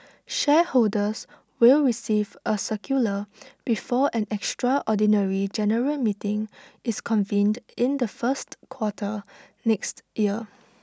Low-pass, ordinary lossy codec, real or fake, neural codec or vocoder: none; none; real; none